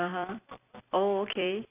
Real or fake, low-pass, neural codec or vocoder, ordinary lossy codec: fake; 3.6 kHz; vocoder, 44.1 kHz, 128 mel bands every 256 samples, BigVGAN v2; AAC, 32 kbps